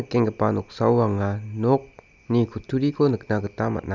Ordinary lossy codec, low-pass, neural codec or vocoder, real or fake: none; 7.2 kHz; none; real